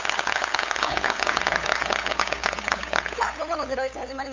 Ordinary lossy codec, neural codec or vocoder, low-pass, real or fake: MP3, 64 kbps; codec, 16 kHz, 8 kbps, FunCodec, trained on LibriTTS, 25 frames a second; 7.2 kHz; fake